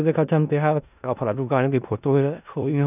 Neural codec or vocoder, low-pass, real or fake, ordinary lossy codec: codec, 16 kHz in and 24 kHz out, 0.4 kbps, LongCat-Audio-Codec, four codebook decoder; 3.6 kHz; fake; none